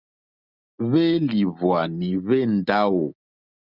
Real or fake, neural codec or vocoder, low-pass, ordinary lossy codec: real; none; 5.4 kHz; Opus, 64 kbps